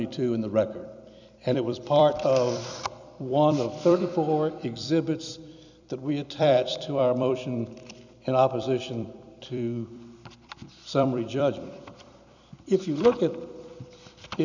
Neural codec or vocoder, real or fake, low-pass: vocoder, 44.1 kHz, 128 mel bands every 256 samples, BigVGAN v2; fake; 7.2 kHz